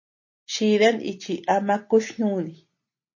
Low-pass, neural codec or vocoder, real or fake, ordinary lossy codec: 7.2 kHz; none; real; MP3, 32 kbps